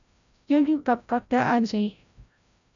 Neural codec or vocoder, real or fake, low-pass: codec, 16 kHz, 0.5 kbps, FreqCodec, larger model; fake; 7.2 kHz